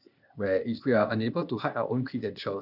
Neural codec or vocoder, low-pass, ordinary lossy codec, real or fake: codec, 16 kHz, 0.8 kbps, ZipCodec; 5.4 kHz; none; fake